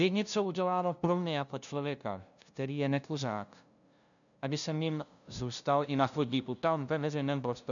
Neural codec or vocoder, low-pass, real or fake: codec, 16 kHz, 0.5 kbps, FunCodec, trained on LibriTTS, 25 frames a second; 7.2 kHz; fake